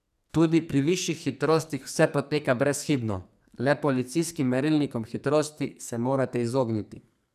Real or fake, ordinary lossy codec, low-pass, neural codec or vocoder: fake; none; 14.4 kHz; codec, 44.1 kHz, 2.6 kbps, SNAC